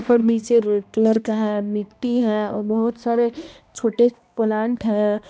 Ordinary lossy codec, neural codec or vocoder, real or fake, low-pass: none; codec, 16 kHz, 1 kbps, X-Codec, HuBERT features, trained on balanced general audio; fake; none